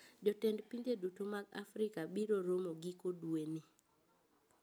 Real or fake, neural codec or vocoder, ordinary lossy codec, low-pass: real; none; none; none